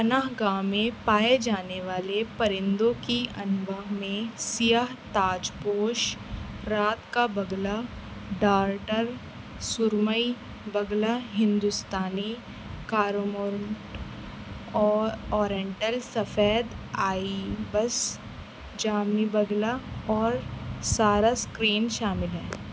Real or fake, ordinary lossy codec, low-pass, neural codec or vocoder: real; none; none; none